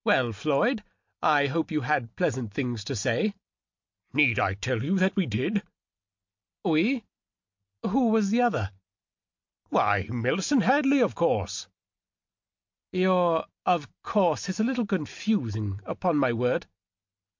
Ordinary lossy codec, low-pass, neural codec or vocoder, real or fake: MP3, 48 kbps; 7.2 kHz; none; real